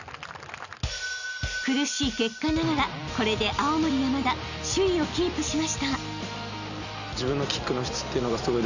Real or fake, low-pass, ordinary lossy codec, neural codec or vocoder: real; 7.2 kHz; none; none